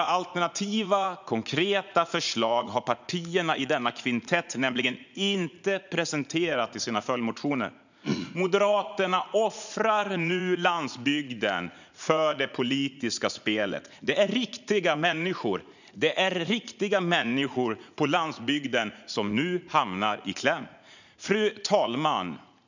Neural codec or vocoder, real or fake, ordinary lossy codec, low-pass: vocoder, 44.1 kHz, 80 mel bands, Vocos; fake; none; 7.2 kHz